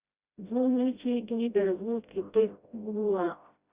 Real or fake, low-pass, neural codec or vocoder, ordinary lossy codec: fake; 3.6 kHz; codec, 16 kHz, 0.5 kbps, FreqCodec, smaller model; Opus, 64 kbps